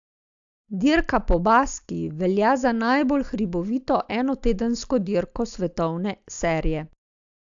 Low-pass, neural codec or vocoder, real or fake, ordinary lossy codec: 7.2 kHz; none; real; AAC, 64 kbps